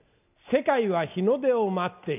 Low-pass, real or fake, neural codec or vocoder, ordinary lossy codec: 3.6 kHz; real; none; none